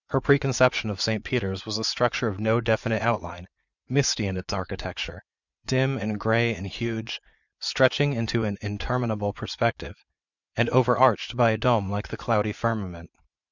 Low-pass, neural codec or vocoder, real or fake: 7.2 kHz; vocoder, 44.1 kHz, 80 mel bands, Vocos; fake